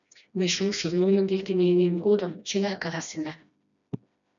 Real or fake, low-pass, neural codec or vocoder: fake; 7.2 kHz; codec, 16 kHz, 1 kbps, FreqCodec, smaller model